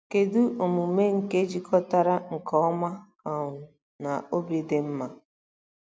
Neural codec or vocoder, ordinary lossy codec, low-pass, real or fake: none; none; none; real